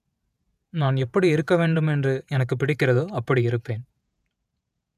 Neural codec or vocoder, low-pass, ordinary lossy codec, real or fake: vocoder, 48 kHz, 128 mel bands, Vocos; 14.4 kHz; none; fake